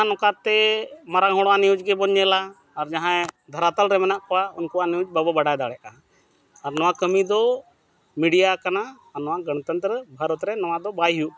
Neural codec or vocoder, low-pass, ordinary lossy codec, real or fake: none; none; none; real